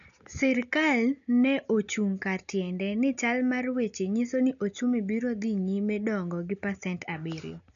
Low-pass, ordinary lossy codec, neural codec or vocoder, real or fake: 7.2 kHz; none; none; real